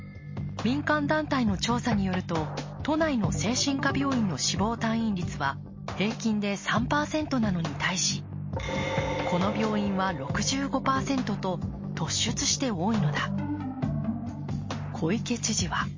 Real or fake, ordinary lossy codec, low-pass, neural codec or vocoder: real; MP3, 32 kbps; 7.2 kHz; none